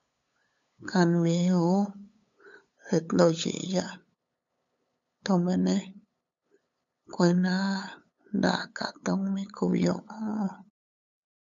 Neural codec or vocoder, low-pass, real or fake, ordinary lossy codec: codec, 16 kHz, 8 kbps, FunCodec, trained on LibriTTS, 25 frames a second; 7.2 kHz; fake; MP3, 64 kbps